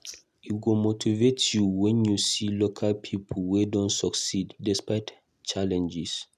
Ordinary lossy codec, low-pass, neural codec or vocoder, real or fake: none; 14.4 kHz; none; real